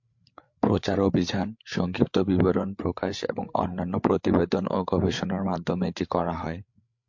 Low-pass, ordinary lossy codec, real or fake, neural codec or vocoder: 7.2 kHz; MP3, 48 kbps; fake; codec, 16 kHz, 8 kbps, FreqCodec, larger model